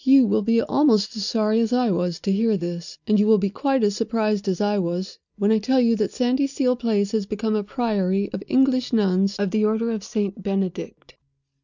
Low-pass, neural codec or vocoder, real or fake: 7.2 kHz; none; real